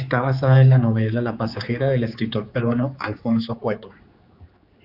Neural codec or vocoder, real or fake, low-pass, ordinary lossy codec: codec, 16 kHz, 4 kbps, X-Codec, HuBERT features, trained on general audio; fake; 5.4 kHz; Opus, 64 kbps